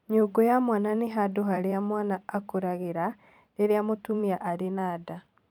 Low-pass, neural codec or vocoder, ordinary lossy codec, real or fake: 19.8 kHz; vocoder, 44.1 kHz, 128 mel bands every 512 samples, BigVGAN v2; none; fake